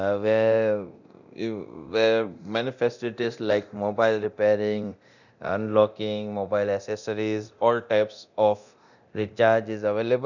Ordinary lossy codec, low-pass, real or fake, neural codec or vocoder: none; 7.2 kHz; fake; codec, 24 kHz, 0.9 kbps, DualCodec